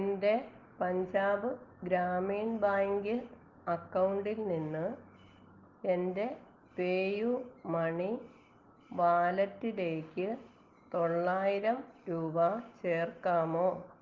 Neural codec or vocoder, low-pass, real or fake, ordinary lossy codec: none; 7.2 kHz; real; Opus, 16 kbps